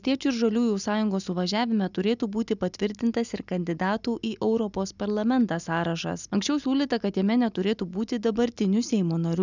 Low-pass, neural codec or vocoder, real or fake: 7.2 kHz; none; real